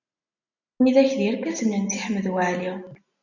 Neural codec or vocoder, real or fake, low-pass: none; real; 7.2 kHz